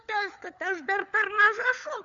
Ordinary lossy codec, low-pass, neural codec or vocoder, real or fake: MP3, 64 kbps; 7.2 kHz; codec, 16 kHz, 4 kbps, FunCodec, trained on LibriTTS, 50 frames a second; fake